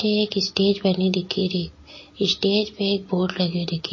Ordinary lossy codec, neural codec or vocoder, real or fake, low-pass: MP3, 32 kbps; none; real; 7.2 kHz